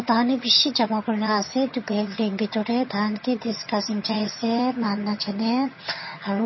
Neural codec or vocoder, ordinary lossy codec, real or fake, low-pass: vocoder, 22.05 kHz, 80 mel bands, HiFi-GAN; MP3, 24 kbps; fake; 7.2 kHz